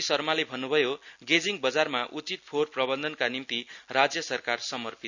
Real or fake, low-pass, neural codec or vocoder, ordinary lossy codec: real; 7.2 kHz; none; none